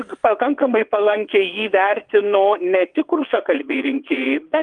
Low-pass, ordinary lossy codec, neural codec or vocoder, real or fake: 9.9 kHz; Opus, 32 kbps; vocoder, 22.05 kHz, 80 mel bands, WaveNeXt; fake